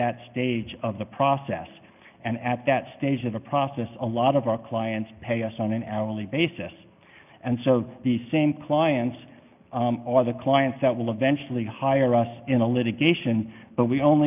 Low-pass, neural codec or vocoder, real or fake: 3.6 kHz; none; real